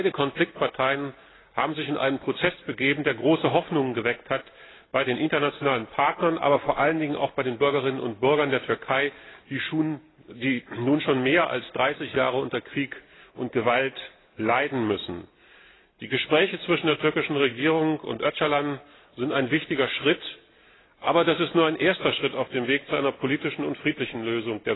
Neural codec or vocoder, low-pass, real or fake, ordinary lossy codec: none; 7.2 kHz; real; AAC, 16 kbps